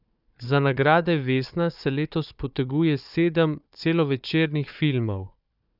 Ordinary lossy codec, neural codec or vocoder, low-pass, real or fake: none; codec, 16 kHz, 4 kbps, FunCodec, trained on Chinese and English, 50 frames a second; 5.4 kHz; fake